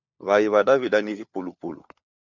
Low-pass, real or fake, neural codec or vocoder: 7.2 kHz; fake; codec, 16 kHz, 4 kbps, FunCodec, trained on LibriTTS, 50 frames a second